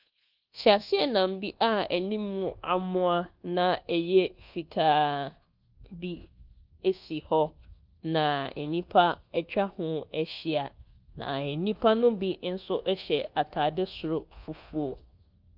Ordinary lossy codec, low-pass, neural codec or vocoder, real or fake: Opus, 24 kbps; 5.4 kHz; codec, 24 kHz, 1.2 kbps, DualCodec; fake